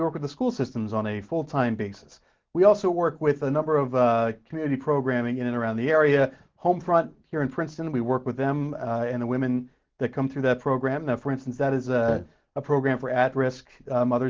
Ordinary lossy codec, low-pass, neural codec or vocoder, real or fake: Opus, 16 kbps; 7.2 kHz; none; real